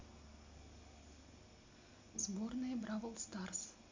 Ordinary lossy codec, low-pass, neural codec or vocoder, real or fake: MP3, 64 kbps; 7.2 kHz; none; real